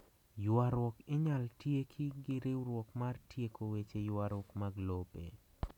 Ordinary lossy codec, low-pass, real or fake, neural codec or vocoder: none; 19.8 kHz; real; none